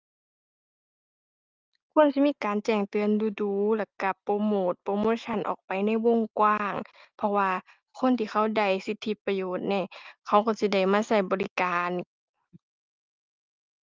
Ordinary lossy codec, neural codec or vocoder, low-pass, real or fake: Opus, 32 kbps; none; 7.2 kHz; real